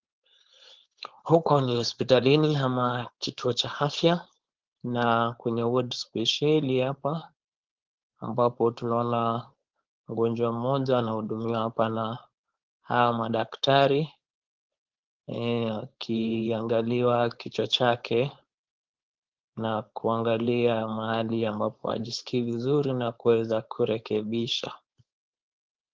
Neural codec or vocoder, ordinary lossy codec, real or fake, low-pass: codec, 16 kHz, 4.8 kbps, FACodec; Opus, 16 kbps; fake; 7.2 kHz